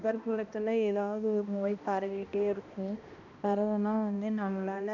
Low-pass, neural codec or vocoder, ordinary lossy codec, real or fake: 7.2 kHz; codec, 16 kHz, 1 kbps, X-Codec, HuBERT features, trained on balanced general audio; none; fake